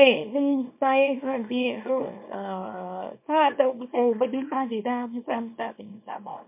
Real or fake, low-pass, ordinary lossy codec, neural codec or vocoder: fake; 3.6 kHz; MP3, 32 kbps; codec, 24 kHz, 0.9 kbps, WavTokenizer, small release